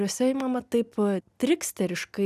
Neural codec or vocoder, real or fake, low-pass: none; real; 14.4 kHz